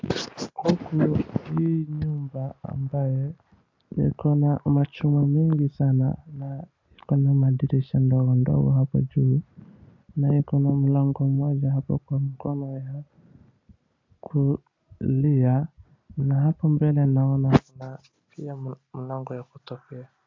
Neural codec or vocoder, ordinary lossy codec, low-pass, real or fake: none; MP3, 64 kbps; 7.2 kHz; real